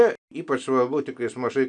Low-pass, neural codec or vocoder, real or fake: 9.9 kHz; none; real